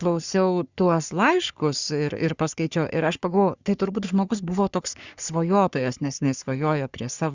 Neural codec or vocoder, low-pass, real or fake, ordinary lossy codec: codec, 44.1 kHz, 3.4 kbps, Pupu-Codec; 7.2 kHz; fake; Opus, 64 kbps